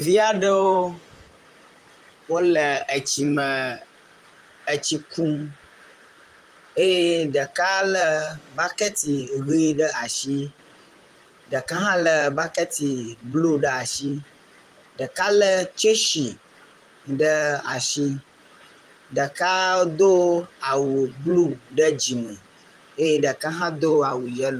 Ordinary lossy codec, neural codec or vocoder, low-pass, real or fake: Opus, 32 kbps; vocoder, 44.1 kHz, 128 mel bands, Pupu-Vocoder; 14.4 kHz; fake